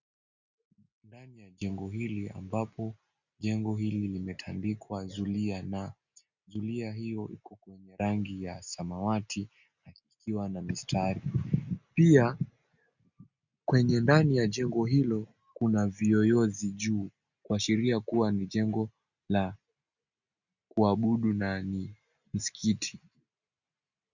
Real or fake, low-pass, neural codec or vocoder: real; 7.2 kHz; none